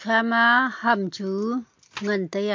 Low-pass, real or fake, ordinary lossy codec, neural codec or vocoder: 7.2 kHz; real; MP3, 48 kbps; none